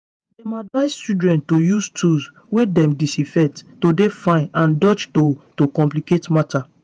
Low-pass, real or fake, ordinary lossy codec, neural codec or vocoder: 9.9 kHz; fake; none; vocoder, 48 kHz, 128 mel bands, Vocos